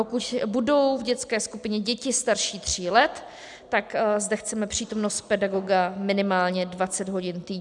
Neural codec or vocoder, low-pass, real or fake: none; 10.8 kHz; real